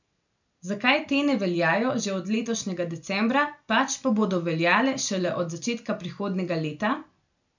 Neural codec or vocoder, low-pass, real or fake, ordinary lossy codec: none; 7.2 kHz; real; none